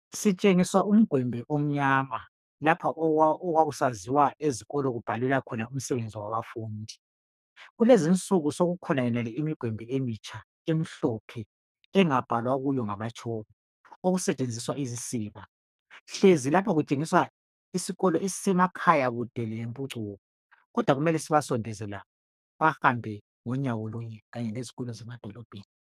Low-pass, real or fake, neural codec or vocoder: 14.4 kHz; fake; codec, 44.1 kHz, 2.6 kbps, SNAC